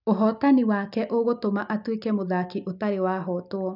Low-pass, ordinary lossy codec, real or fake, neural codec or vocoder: 5.4 kHz; none; real; none